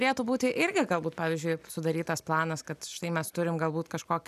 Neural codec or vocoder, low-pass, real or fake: none; 14.4 kHz; real